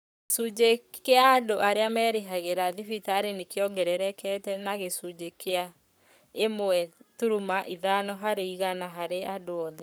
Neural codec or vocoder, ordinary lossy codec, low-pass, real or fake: codec, 44.1 kHz, 7.8 kbps, Pupu-Codec; none; none; fake